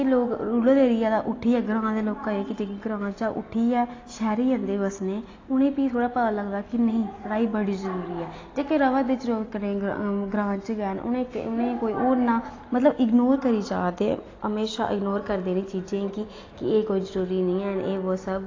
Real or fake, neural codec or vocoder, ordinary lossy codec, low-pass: real; none; AAC, 32 kbps; 7.2 kHz